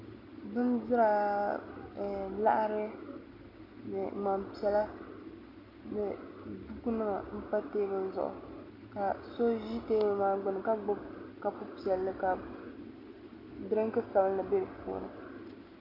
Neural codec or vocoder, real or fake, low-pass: none; real; 5.4 kHz